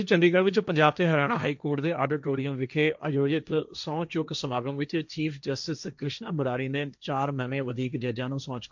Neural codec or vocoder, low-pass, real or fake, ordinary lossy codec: codec, 16 kHz, 1.1 kbps, Voila-Tokenizer; 7.2 kHz; fake; none